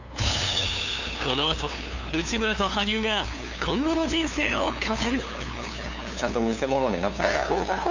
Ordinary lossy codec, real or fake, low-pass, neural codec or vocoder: none; fake; 7.2 kHz; codec, 16 kHz, 2 kbps, FunCodec, trained on LibriTTS, 25 frames a second